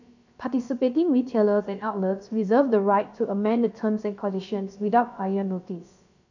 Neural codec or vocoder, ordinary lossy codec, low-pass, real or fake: codec, 16 kHz, about 1 kbps, DyCAST, with the encoder's durations; none; 7.2 kHz; fake